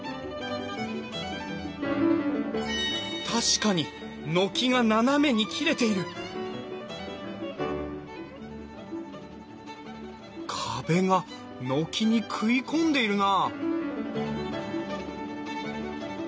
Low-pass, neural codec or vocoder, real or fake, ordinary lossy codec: none; none; real; none